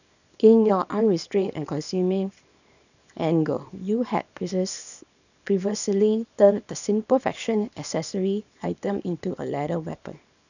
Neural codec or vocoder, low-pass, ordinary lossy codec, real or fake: codec, 24 kHz, 0.9 kbps, WavTokenizer, small release; 7.2 kHz; none; fake